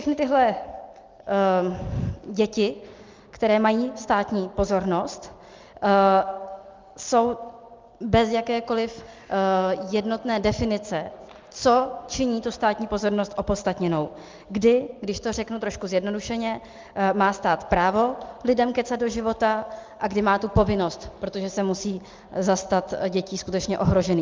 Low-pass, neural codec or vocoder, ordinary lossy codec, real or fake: 7.2 kHz; none; Opus, 24 kbps; real